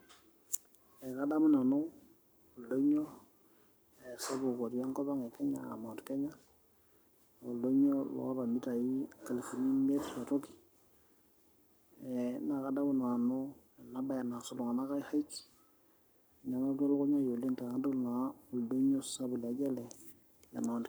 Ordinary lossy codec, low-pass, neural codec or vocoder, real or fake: none; none; codec, 44.1 kHz, 7.8 kbps, Pupu-Codec; fake